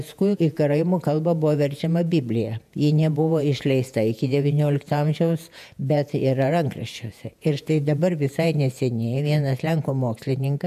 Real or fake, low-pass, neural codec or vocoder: fake; 14.4 kHz; vocoder, 48 kHz, 128 mel bands, Vocos